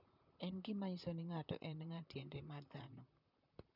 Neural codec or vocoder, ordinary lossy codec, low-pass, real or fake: vocoder, 44.1 kHz, 128 mel bands, Pupu-Vocoder; none; 5.4 kHz; fake